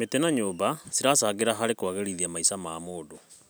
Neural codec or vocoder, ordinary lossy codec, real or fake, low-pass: none; none; real; none